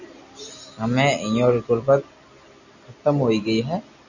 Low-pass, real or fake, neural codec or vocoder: 7.2 kHz; real; none